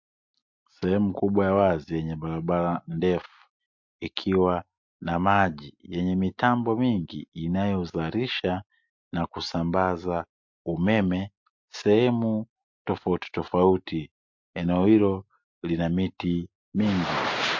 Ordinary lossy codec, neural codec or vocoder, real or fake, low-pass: MP3, 48 kbps; none; real; 7.2 kHz